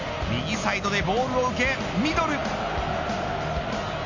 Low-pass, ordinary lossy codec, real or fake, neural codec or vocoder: 7.2 kHz; MP3, 48 kbps; real; none